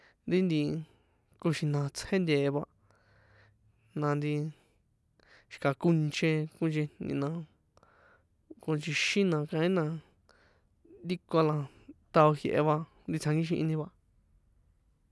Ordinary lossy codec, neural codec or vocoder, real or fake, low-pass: none; none; real; none